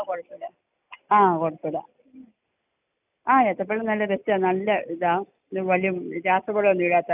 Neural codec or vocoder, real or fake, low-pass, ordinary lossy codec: none; real; 3.6 kHz; none